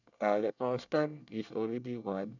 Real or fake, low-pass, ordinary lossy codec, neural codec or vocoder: fake; 7.2 kHz; none; codec, 24 kHz, 1 kbps, SNAC